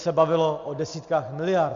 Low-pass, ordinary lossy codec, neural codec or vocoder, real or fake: 7.2 kHz; Opus, 64 kbps; none; real